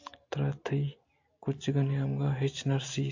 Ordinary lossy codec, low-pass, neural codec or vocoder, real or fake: AAC, 48 kbps; 7.2 kHz; none; real